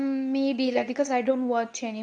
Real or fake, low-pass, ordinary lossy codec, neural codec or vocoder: fake; 9.9 kHz; none; codec, 24 kHz, 0.9 kbps, WavTokenizer, medium speech release version 2